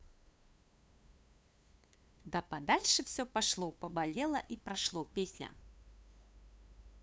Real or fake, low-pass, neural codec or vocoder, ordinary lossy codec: fake; none; codec, 16 kHz, 2 kbps, FunCodec, trained on LibriTTS, 25 frames a second; none